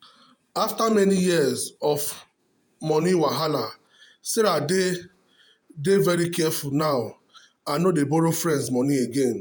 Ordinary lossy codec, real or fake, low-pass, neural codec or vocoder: none; fake; none; vocoder, 48 kHz, 128 mel bands, Vocos